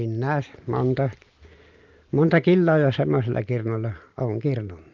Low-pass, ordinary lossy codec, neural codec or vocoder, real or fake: 7.2 kHz; Opus, 32 kbps; none; real